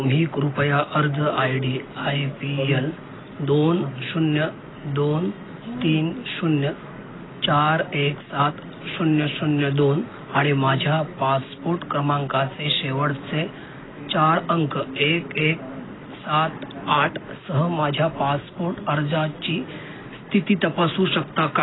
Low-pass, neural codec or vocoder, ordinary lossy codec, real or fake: 7.2 kHz; none; AAC, 16 kbps; real